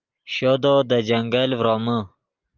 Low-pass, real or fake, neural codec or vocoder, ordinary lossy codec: 7.2 kHz; real; none; Opus, 24 kbps